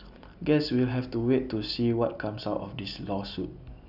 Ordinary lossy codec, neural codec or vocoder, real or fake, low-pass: none; none; real; 5.4 kHz